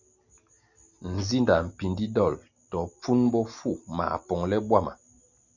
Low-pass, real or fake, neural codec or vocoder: 7.2 kHz; real; none